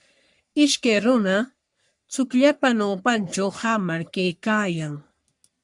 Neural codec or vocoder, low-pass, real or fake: codec, 44.1 kHz, 3.4 kbps, Pupu-Codec; 10.8 kHz; fake